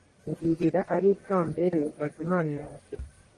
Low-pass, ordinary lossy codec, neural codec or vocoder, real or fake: 10.8 kHz; Opus, 32 kbps; codec, 44.1 kHz, 1.7 kbps, Pupu-Codec; fake